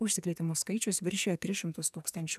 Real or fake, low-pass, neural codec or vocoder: fake; 14.4 kHz; codec, 44.1 kHz, 2.6 kbps, SNAC